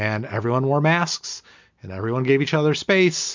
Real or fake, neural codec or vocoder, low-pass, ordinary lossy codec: real; none; 7.2 kHz; MP3, 64 kbps